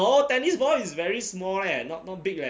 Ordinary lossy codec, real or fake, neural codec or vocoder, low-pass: none; real; none; none